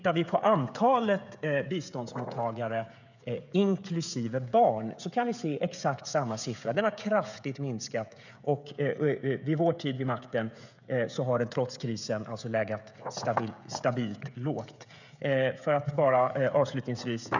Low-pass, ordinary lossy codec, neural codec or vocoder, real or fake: 7.2 kHz; none; codec, 16 kHz, 16 kbps, FreqCodec, smaller model; fake